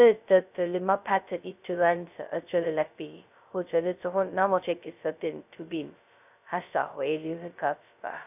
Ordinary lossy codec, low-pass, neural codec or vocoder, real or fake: none; 3.6 kHz; codec, 16 kHz, 0.2 kbps, FocalCodec; fake